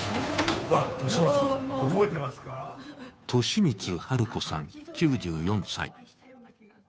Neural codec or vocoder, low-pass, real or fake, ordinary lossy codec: codec, 16 kHz, 2 kbps, FunCodec, trained on Chinese and English, 25 frames a second; none; fake; none